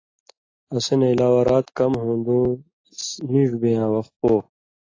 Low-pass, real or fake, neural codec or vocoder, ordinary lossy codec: 7.2 kHz; real; none; AAC, 32 kbps